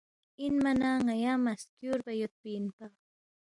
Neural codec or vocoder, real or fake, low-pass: none; real; 10.8 kHz